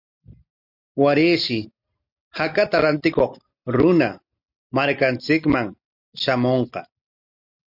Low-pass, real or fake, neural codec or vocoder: 5.4 kHz; real; none